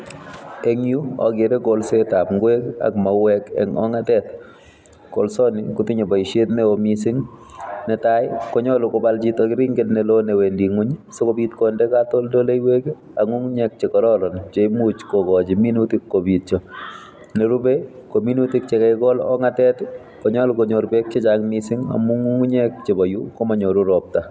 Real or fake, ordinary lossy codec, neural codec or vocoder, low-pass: real; none; none; none